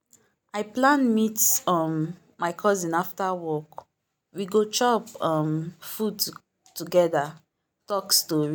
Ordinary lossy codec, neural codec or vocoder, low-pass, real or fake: none; none; none; real